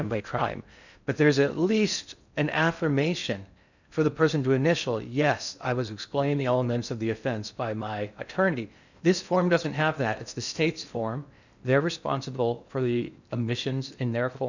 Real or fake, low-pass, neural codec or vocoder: fake; 7.2 kHz; codec, 16 kHz in and 24 kHz out, 0.6 kbps, FocalCodec, streaming, 4096 codes